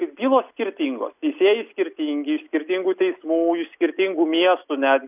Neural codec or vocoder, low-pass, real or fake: none; 3.6 kHz; real